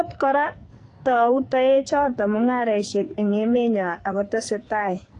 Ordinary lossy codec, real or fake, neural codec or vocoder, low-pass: AAC, 48 kbps; fake; codec, 44.1 kHz, 2.6 kbps, SNAC; 10.8 kHz